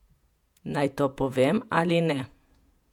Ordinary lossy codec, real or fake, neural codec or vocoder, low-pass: MP3, 96 kbps; fake; vocoder, 48 kHz, 128 mel bands, Vocos; 19.8 kHz